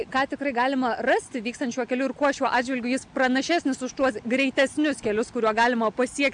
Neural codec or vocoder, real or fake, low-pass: none; real; 9.9 kHz